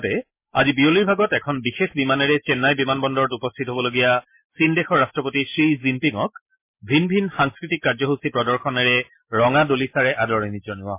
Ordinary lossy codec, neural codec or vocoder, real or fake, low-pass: MP3, 24 kbps; none; real; 3.6 kHz